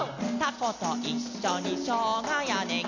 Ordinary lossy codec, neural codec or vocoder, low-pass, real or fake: none; none; 7.2 kHz; real